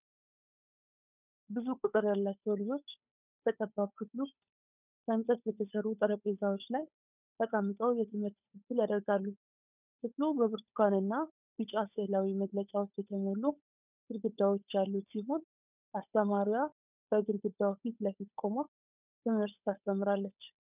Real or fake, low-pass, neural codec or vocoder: fake; 3.6 kHz; codec, 16 kHz, 8 kbps, FunCodec, trained on Chinese and English, 25 frames a second